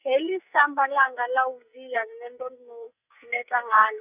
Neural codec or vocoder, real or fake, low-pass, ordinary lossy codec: codec, 44.1 kHz, 2.6 kbps, SNAC; fake; 3.6 kHz; none